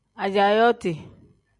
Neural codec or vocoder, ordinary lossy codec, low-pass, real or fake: none; MP3, 96 kbps; 10.8 kHz; real